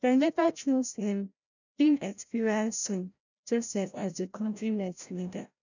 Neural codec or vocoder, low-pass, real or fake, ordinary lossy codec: codec, 16 kHz, 0.5 kbps, FreqCodec, larger model; 7.2 kHz; fake; none